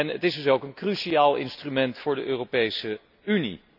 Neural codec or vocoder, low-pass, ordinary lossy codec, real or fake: none; 5.4 kHz; AAC, 48 kbps; real